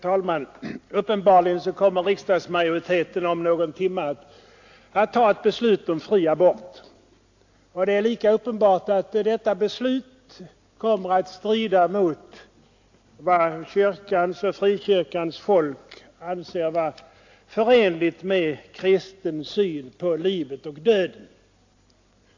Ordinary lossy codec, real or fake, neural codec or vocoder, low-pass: AAC, 48 kbps; real; none; 7.2 kHz